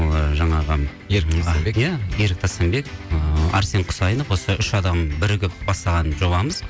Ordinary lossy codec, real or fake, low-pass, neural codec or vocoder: none; real; none; none